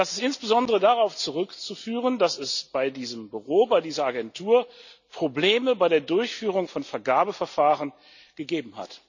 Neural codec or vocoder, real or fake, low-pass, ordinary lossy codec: none; real; 7.2 kHz; none